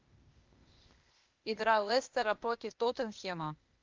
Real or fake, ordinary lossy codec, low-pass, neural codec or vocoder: fake; Opus, 24 kbps; 7.2 kHz; codec, 16 kHz, 0.8 kbps, ZipCodec